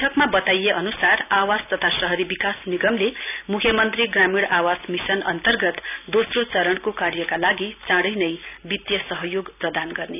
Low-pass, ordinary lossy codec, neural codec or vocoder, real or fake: 3.6 kHz; none; none; real